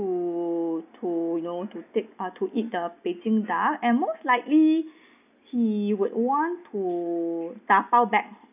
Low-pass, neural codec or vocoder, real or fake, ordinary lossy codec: 3.6 kHz; none; real; none